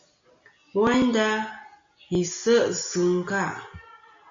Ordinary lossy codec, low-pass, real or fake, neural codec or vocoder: MP3, 48 kbps; 7.2 kHz; real; none